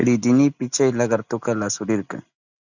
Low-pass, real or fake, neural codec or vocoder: 7.2 kHz; real; none